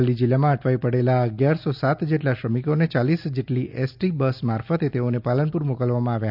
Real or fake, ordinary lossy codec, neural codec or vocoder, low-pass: real; none; none; 5.4 kHz